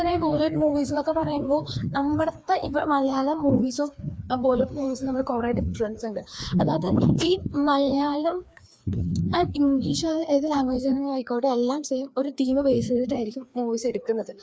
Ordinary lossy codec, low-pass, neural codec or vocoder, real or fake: none; none; codec, 16 kHz, 2 kbps, FreqCodec, larger model; fake